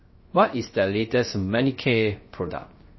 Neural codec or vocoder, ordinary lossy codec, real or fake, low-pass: codec, 16 kHz, 0.3 kbps, FocalCodec; MP3, 24 kbps; fake; 7.2 kHz